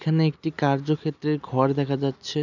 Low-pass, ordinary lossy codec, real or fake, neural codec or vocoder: 7.2 kHz; none; real; none